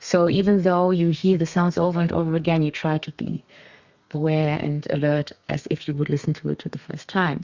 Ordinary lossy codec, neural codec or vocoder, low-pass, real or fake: Opus, 64 kbps; codec, 32 kHz, 1.9 kbps, SNAC; 7.2 kHz; fake